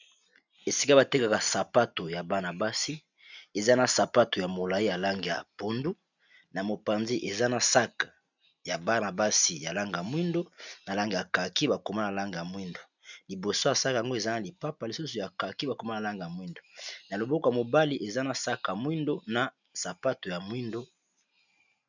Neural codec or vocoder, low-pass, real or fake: none; 7.2 kHz; real